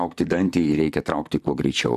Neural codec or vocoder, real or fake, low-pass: vocoder, 44.1 kHz, 128 mel bands, Pupu-Vocoder; fake; 14.4 kHz